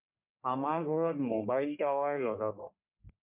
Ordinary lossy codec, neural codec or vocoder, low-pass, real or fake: MP3, 32 kbps; codec, 44.1 kHz, 1.7 kbps, Pupu-Codec; 3.6 kHz; fake